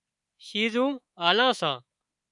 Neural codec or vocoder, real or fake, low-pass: codec, 24 kHz, 3.1 kbps, DualCodec; fake; 10.8 kHz